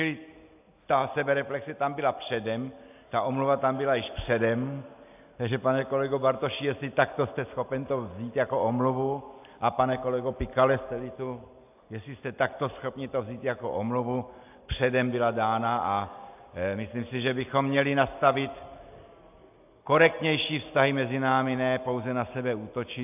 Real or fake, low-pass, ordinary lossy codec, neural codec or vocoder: real; 3.6 kHz; AAC, 32 kbps; none